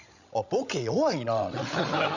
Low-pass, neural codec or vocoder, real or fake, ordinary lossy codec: 7.2 kHz; codec, 16 kHz, 16 kbps, FreqCodec, larger model; fake; none